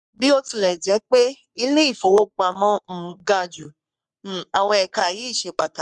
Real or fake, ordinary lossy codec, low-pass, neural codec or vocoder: fake; MP3, 96 kbps; 10.8 kHz; codec, 44.1 kHz, 3.4 kbps, Pupu-Codec